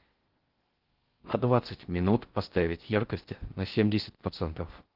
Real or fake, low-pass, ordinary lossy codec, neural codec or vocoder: fake; 5.4 kHz; Opus, 16 kbps; codec, 16 kHz in and 24 kHz out, 0.6 kbps, FocalCodec, streaming, 4096 codes